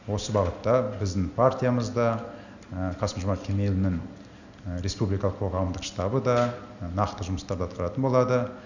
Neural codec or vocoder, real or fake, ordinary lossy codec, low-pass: none; real; none; 7.2 kHz